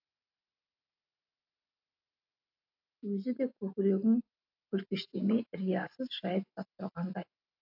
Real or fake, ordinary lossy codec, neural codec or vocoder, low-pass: real; AAC, 48 kbps; none; 5.4 kHz